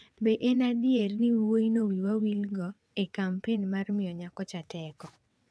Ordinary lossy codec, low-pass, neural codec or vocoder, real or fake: none; none; vocoder, 22.05 kHz, 80 mel bands, WaveNeXt; fake